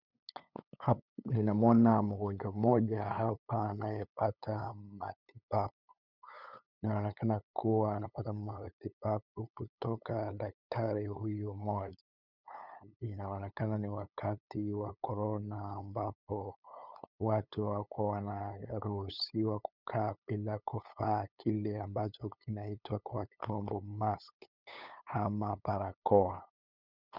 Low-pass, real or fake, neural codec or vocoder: 5.4 kHz; fake; codec, 16 kHz, 8 kbps, FunCodec, trained on LibriTTS, 25 frames a second